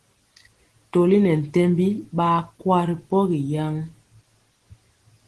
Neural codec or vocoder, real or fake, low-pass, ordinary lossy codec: none; real; 10.8 kHz; Opus, 16 kbps